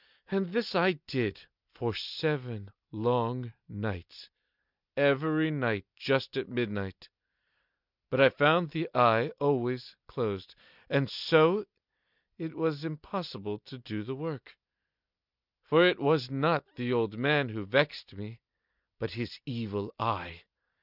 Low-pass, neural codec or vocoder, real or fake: 5.4 kHz; none; real